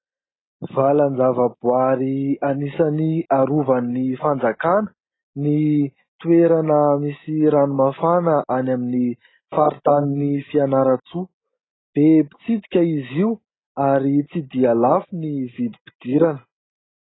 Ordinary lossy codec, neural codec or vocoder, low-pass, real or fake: AAC, 16 kbps; none; 7.2 kHz; real